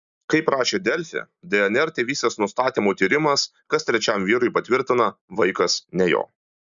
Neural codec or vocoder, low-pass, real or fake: none; 7.2 kHz; real